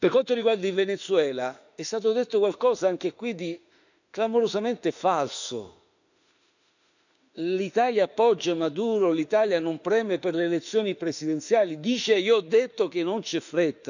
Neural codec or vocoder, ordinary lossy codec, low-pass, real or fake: autoencoder, 48 kHz, 32 numbers a frame, DAC-VAE, trained on Japanese speech; none; 7.2 kHz; fake